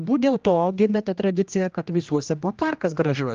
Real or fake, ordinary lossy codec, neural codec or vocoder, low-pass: fake; Opus, 32 kbps; codec, 16 kHz, 1 kbps, X-Codec, HuBERT features, trained on general audio; 7.2 kHz